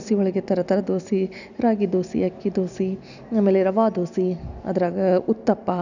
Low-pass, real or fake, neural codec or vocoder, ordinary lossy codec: 7.2 kHz; real; none; none